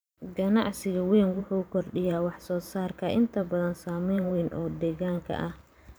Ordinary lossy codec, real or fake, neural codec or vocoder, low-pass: none; fake; vocoder, 44.1 kHz, 128 mel bands every 512 samples, BigVGAN v2; none